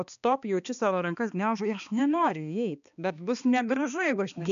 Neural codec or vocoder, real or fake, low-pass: codec, 16 kHz, 2 kbps, X-Codec, HuBERT features, trained on balanced general audio; fake; 7.2 kHz